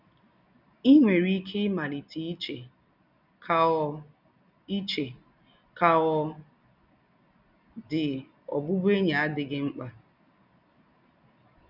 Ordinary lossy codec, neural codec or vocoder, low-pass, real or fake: none; none; 5.4 kHz; real